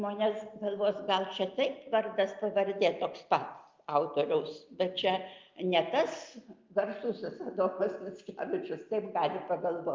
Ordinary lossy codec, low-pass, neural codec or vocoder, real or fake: Opus, 24 kbps; 7.2 kHz; none; real